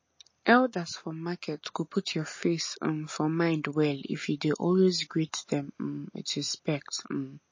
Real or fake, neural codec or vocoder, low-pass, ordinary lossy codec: real; none; 7.2 kHz; MP3, 32 kbps